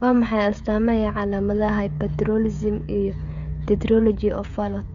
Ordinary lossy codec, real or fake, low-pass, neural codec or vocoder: MP3, 64 kbps; fake; 7.2 kHz; codec, 16 kHz, 16 kbps, FreqCodec, smaller model